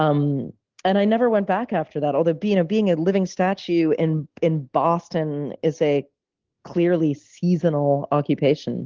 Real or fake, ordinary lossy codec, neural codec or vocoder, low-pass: real; Opus, 16 kbps; none; 7.2 kHz